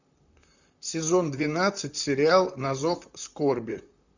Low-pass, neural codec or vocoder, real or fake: 7.2 kHz; vocoder, 44.1 kHz, 128 mel bands, Pupu-Vocoder; fake